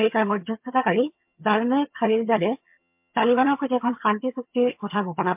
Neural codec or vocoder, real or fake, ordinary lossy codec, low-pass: vocoder, 22.05 kHz, 80 mel bands, HiFi-GAN; fake; MP3, 32 kbps; 3.6 kHz